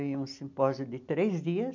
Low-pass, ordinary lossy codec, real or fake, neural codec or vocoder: 7.2 kHz; MP3, 64 kbps; real; none